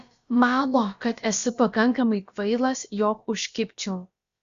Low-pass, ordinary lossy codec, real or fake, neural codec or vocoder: 7.2 kHz; Opus, 64 kbps; fake; codec, 16 kHz, about 1 kbps, DyCAST, with the encoder's durations